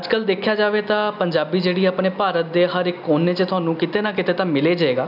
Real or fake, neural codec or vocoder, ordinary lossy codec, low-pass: real; none; none; 5.4 kHz